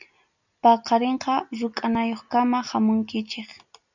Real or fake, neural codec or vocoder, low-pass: real; none; 7.2 kHz